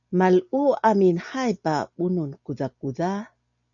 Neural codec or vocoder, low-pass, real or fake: none; 7.2 kHz; real